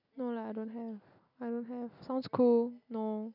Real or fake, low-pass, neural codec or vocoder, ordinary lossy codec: real; 5.4 kHz; none; none